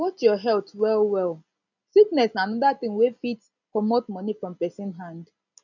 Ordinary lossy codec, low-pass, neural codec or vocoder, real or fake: none; 7.2 kHz; none; real